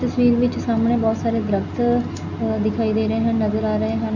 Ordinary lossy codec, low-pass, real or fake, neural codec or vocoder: none; 7.2 kHz; real; none